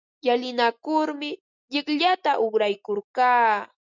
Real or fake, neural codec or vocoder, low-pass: real; none; 7.2 kHz